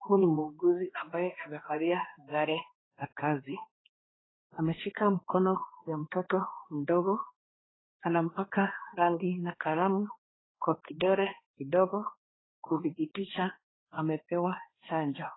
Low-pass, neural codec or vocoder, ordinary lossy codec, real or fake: 7.2 kHz; codec, 16 kHz, 2 kbps, X-Codec, HuBERT features, trained on balanced general audio; AAC, 16 kbps; fake